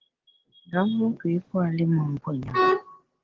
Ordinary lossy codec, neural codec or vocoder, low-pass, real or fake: Opus, 16 kbps; none; 7.2 kHz; real